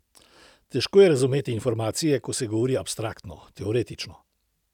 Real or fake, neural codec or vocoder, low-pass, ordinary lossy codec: real; none; 19.8 kHz; none